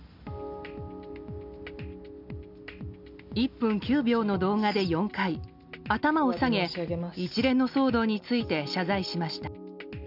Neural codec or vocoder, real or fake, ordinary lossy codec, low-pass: none; real; none; 5.4 kHz